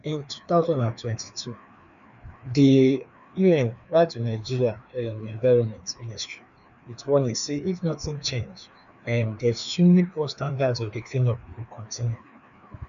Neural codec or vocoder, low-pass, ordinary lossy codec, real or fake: codec, 16 kHz, 2 kbps, FreqCodec, larger model; 7.2 kHz; none; fake